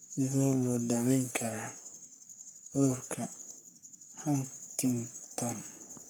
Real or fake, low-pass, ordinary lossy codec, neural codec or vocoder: fake; none; none; codec, 44.1 kHz, 3.4 kbps, Pupu-Codec